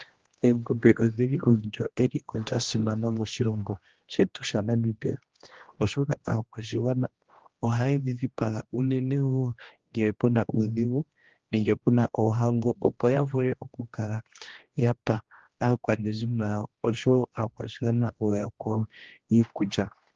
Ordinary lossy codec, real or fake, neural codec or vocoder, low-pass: Opus, 16 kbps; fake; codec, 16 kHz, 1 kbps, X-Codec, HuBERT features, trained on general audio; 7.2 kHz